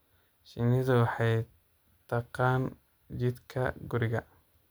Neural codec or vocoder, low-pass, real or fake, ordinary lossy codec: none; none; real; none